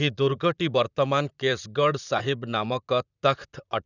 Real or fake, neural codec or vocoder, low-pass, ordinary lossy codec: fake; vocoder, 44.1 kHz, 128 mel bands, Pupu-Vocoder; 7.2 kHz; none